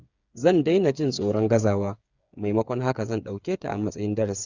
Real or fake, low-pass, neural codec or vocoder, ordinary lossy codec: fake; 7.2 kHz; codec, 16 kHz, 8 kbps, FreqCodec, smaller model; Opus, 64 kbps